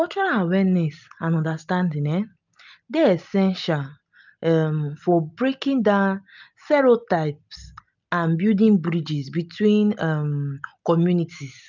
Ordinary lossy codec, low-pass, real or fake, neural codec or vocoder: none; 7.2 kHz; real; none